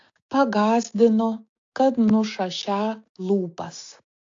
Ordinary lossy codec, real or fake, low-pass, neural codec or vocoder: AAC, 48 kbps; fake; 7.2 kHz; codec, 16 kHz, 6 kbps, DAC